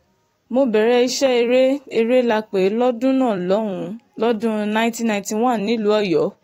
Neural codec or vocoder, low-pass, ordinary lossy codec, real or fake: vocoder, 44.1 kHz, 128 mel bands every 256 samples, BigVGAN v2; 19.8 kHz; AAC, 48 kbps; fake